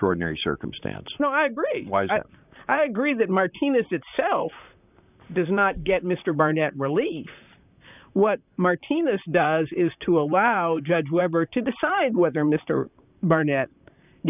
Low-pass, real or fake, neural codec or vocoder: 3.6 kHz; fake; vocoder, 44.1 kHz, 128 mel bands, Pupu-Vocoder